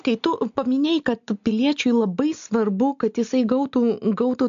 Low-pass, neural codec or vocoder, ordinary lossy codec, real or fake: 7.2 kHz; none; AAC, 64 kbps; real